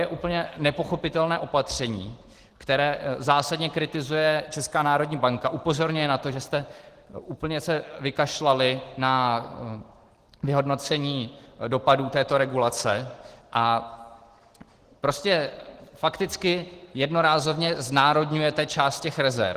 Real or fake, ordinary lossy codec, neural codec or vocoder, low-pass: real; Opus, 16 kbps; none; 14.4 kHz